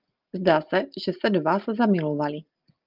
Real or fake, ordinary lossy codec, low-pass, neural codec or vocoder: real; Opus, 24 kbps; 5.4 kHz; none